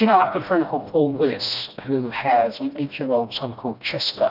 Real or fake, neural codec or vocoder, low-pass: fake; codec, 16 kHz, 1 kbps, FreqCodec, smaller model; 5.4 kHz